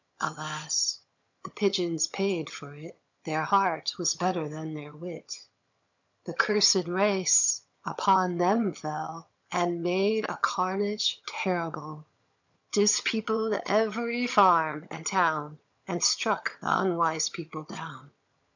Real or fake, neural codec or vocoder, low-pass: fake; vocoder, 22.05 kHz, 80 mel bands, HiFi-GAN; 7.2 kHz